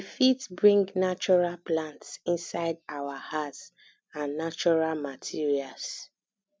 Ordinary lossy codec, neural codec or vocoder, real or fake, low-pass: none; none; real; none